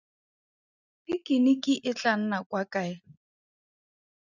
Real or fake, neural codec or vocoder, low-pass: real; none; 7.2 kHz